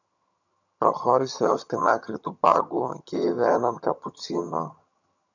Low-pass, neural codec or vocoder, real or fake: 7.2 kHz; vocoder, 22.05 kHz, 80 mel bands, HiFi-GAN; fake